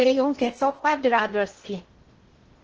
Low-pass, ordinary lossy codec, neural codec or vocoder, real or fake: 7.2 kHz; Opus, 16 kbps; codec, 16 kHz in and 24 kHz out, 0.6 kbps, FocalCodec, streaming, 2048 codes; fake